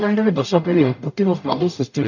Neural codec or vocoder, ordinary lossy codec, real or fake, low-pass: codec, 44.1 kHz, 0.9 kbps, DAC; none; fake; 7.2 kHz